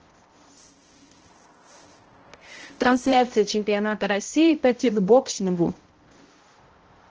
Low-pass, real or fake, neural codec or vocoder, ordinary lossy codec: 7.2 kHz; fake; codec, 16 kHz, 0.5 kbps, X-Codec, HuBERT features, trained on balanced general audio; Opus, 16 kbps